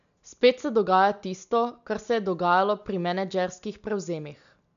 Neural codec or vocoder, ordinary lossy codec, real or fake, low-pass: none; none; real; 7.2 kHz